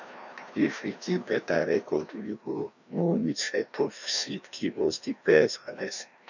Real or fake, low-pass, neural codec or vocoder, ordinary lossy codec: fake; 7.2 kHz; codec, 16 kHz, 1 kbps, FreqCodec, larger model; none